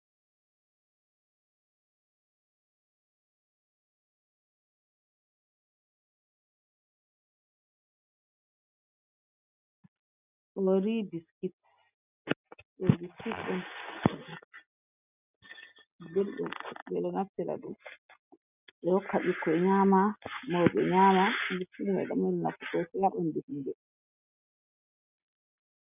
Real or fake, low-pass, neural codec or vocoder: real; 3.6 kHz; none